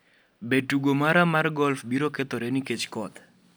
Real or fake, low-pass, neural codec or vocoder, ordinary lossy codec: real; none; none; none